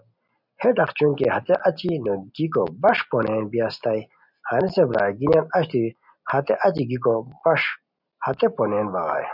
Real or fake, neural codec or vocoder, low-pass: real; none; 5.4 kHz